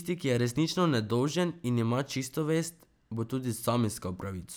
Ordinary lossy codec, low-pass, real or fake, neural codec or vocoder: none; none; real; none